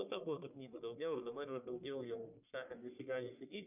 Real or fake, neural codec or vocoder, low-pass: fake; codec, 44.1 kHz, 1.7 kbps, Pupu-Codec; 3.6 kHz